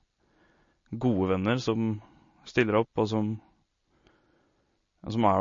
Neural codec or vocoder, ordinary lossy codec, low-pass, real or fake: none; MP3, 32 kbps; 7.2 kHz; real